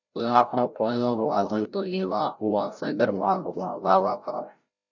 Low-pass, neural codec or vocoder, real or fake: 7.2 kHz; codec, 16 kHz, 0.5 kbps, FreqCodec, larger model; fake